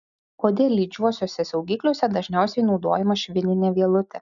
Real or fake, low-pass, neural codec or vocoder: real; 7.2 kHz; none